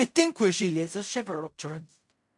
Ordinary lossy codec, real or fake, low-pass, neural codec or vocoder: MP3, 96 kbps; fake; 10.8 kHz; codec, 16 kHz in and 24 kHz out, 0.4 kbps, LongCat-Audio-Codec, fine tuned four codebook decoder